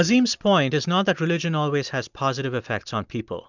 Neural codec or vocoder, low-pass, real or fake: none; 7.2 kHz; real